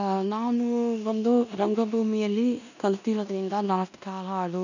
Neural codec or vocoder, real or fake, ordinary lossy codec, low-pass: codec, 16 kHz in and 24 kHz out, 0.9 kbps, LongCat-Audio-Codec, four codebook decoder; fake; none; 7.2 kHz